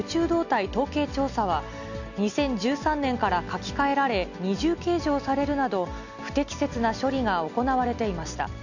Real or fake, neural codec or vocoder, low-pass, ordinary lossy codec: real; none; 7.2 kHz; none